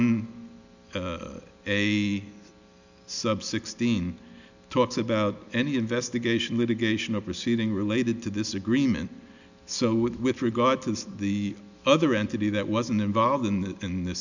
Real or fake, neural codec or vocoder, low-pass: real; none; 7.2 kHz